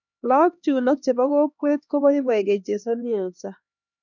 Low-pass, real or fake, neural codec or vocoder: 7.2 kHz; fake; codec, 16 kHz, 2 kbps, X-Codec, HuBERT features, trained on LibriSpeech